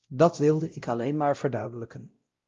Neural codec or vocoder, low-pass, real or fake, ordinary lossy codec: codec, 16 kHz, 0.5 kbps, X-Codec, WavLM features, trained on Multilingual LibriSpeech; 7.2 kHz; fake; Opus, 32 kbps